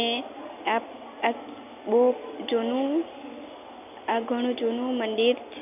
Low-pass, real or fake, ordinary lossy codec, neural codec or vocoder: 3.6 kHz; real; none; none